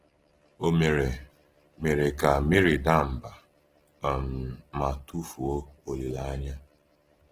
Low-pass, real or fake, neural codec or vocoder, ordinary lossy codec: 14.4 kHz; real; none; Opus, 16 kbps